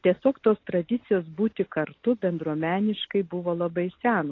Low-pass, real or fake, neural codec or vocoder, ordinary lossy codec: 7.2 kHz; real; none; AAC, 32 kbps